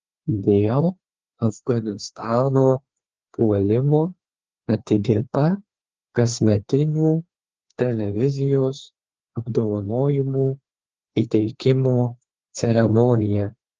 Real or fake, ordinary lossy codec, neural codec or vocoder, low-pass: fake; Opus, 16 kbps; codec, 16 kHz, 2 kbps, FreqCodec, larger model; 7.2 kHz